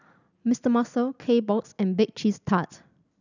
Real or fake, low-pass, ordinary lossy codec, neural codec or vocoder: real; 7.2 kHz; none; none